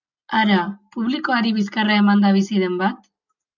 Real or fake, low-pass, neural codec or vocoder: real; 7.2 kHz; none